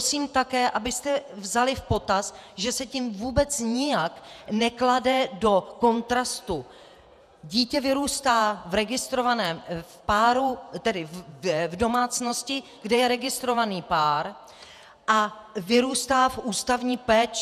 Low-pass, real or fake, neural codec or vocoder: 14.4 kHz; fake; vocoder, 48 kHz, 128 mel bands, Vocos